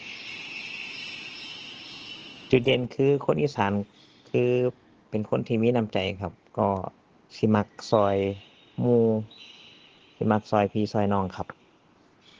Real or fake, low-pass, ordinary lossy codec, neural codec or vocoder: real; 7.2 kHz; Opus, 16 kbps; none